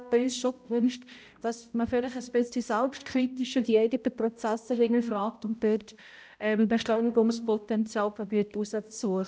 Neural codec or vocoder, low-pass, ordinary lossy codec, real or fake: codec, 16 kHz, 0.5 kbps, X-Codec, HuBERT features, trained on balanced general audio; none; none; fake